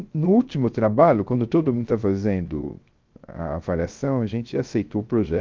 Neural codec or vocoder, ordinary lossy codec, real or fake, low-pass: codec, 16 kHz, 0.3 kbps, FocalCodec; Opus, 24 kbps; fake; 7.2 kHz